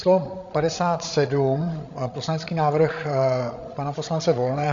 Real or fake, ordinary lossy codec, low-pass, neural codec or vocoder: fake; AAC, 64 kbps; 7.2 kHz; codec, 16 kHz, 16 kbps, FreqCodec, larger model